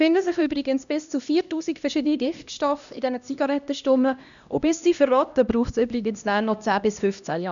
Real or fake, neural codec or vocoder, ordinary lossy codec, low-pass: fake; codec, 16 kHz, 1 kbps, X-Codec, HuBERT features, trained on LibriSpeech; none; 7.2 kHz